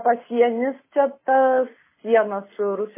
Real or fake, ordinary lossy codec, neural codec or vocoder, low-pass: real; MP3, 16 kbps; none; 3.6 kHz